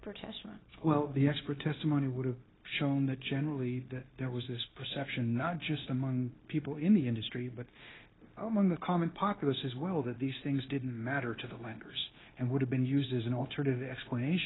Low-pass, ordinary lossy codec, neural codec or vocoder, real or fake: 7.2 kHz; AAC, 16 kbps; codec, 16 kHz, 0.9 kbps, LongCat-Audio-Codec; fake